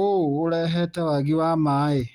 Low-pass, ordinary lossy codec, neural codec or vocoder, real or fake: 19.8 kHz; Opus, 32 kbps; none; real